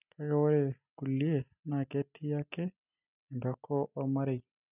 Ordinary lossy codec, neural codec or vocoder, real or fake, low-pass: none; none; real; 3.6 kHz